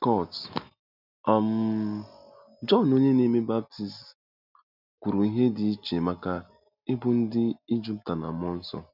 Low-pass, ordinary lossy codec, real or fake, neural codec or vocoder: 5.4 kHz; none; real; none